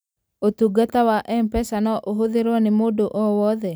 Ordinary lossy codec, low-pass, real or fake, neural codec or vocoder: none; none; real; none